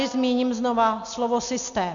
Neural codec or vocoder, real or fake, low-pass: none; real; 7.2 kHz